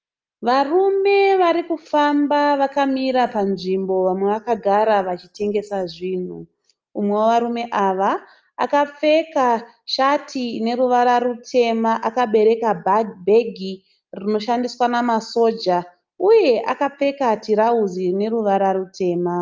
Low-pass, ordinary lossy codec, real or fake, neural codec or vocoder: 7.2 kHz; Opus, 24 kbps; real; none